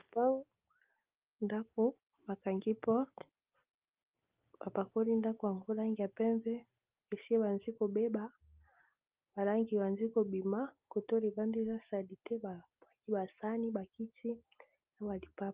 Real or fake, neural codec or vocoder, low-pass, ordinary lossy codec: real; none; 3.6 kHz; Opus, 24 kbps